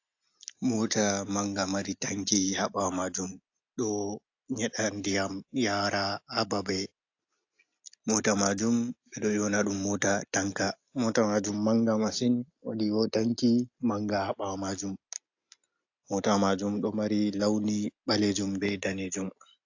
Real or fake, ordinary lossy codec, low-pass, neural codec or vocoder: real; AAC, 48 kbps; 7.2 kHz; none